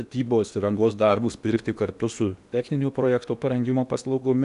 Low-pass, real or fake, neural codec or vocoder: 10.8 kHz; fake; codec, 16 kHz in and 24 kHz out, 0.8 kbps, FocalCodec, streaming, 65536 codes